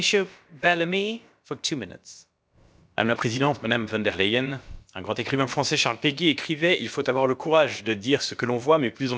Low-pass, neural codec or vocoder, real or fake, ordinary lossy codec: none; codec, 16 kHz, about 1 kbps, DyCAST, with the encoder's durations; fake; none